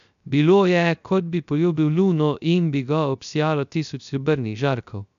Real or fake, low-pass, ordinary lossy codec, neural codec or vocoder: fake; 7.2 kHz; none; codec, 16 kHz, 0.3 kbps, FocalCodec